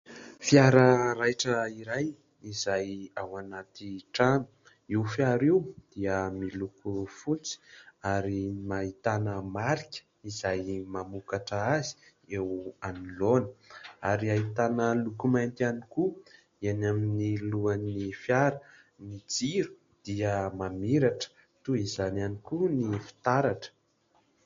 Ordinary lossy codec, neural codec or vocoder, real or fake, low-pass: MP3, 48 kbps; none; real; 7.2 kHz